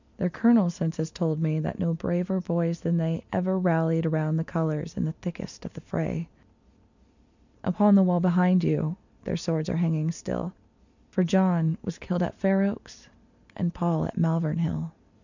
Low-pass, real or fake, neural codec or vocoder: 7.2 kHz; real; none